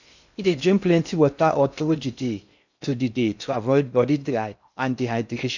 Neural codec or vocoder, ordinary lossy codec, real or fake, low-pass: codec, 16 kHz in and 24 kHz out, 0.6 kbps, FocalCodec, streaming, 2048 codes; none; fake; 7.2 kHz